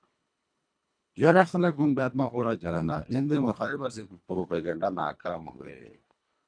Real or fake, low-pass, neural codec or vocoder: fake; 9.9 kHz; codec, 24 kHz, 1.5 kbps, HILCodec